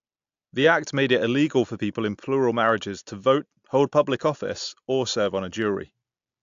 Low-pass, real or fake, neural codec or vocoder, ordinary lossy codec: 7.2 kHz; real; none; AAC, 64 kbps